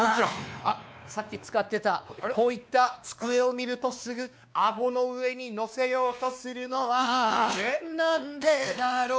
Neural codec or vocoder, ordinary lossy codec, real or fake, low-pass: codec, 16 kHz, 2 kbps, X-Codec, WavLM features, trained on Multilingual LibriSpeech; none; fake; none